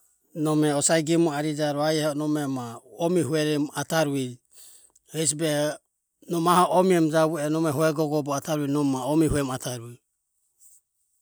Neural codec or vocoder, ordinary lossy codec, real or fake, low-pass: none; none; real; none